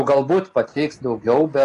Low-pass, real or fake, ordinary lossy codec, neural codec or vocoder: 10.8 kHz; real; AAC, 48 kbps; none